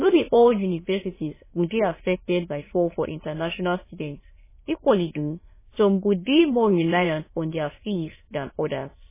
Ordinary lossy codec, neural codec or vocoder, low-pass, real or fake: MP3, 16 kbps; autoencoder, 22.05 kHz, a latent of 192 numbers a frame, VITS, trained on many speakers; 3.6 kHz; fake